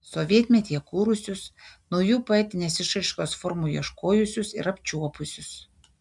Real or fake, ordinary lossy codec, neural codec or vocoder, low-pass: real; MP3, 96 kbps; none; 10.8 kHz